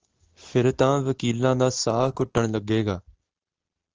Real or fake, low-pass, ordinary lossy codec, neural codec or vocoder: real; 7.2 kHz; Opus, 16 kbps; none